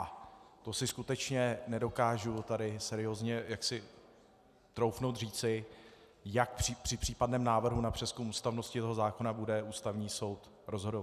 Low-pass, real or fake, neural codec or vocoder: 14.4 kHz; real; none